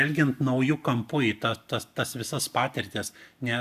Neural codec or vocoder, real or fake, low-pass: none; real; 14.4 kHz